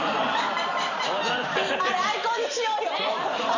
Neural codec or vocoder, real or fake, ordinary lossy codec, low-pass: vocoder, 44.1 kHz, 128 mel bands every 256 samples, BigVGAN v2; fake; none; 7.2 kHz